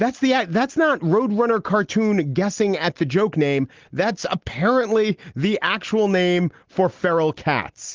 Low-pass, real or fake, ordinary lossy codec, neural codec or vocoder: 7.2 kHz; real; Opus, 16 kbps; none